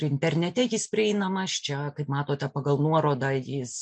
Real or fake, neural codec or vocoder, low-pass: real; none; 9.9 kHz